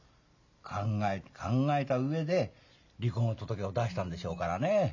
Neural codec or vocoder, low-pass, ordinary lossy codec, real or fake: none; 7.2 kHz; none; real